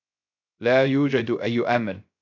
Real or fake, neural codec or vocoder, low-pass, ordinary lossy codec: fake; codec, 16 kHz, 0.3 kbps, FocalCodec; 7.2 kHz; AAC, 48 kbps